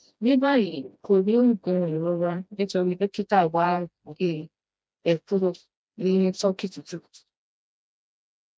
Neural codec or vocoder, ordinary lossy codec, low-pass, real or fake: codec, 16 kHz, 1 kbps, FreqCodec, smaller model; none; none; fake